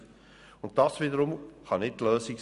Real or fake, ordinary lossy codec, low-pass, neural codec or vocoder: real; none; 10.8 kHz; none